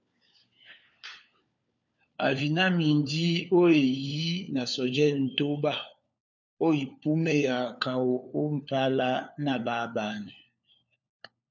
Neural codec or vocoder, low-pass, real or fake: codec, 16 kHz, 4 kbps, FunCodec, trained on LibriTTS, 50 frames a second; 7.2 kHz; fake